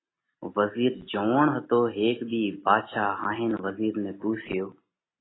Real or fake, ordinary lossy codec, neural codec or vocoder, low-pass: real; AAC, 16 kbps; none; 7.2 kHz